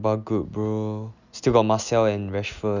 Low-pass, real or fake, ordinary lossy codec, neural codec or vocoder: 7.2 kHz; real; none; none